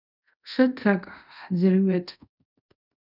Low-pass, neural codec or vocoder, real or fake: 5.4 kHz; codec, 24 kHz, 0.5 kbps, DualCodec; fake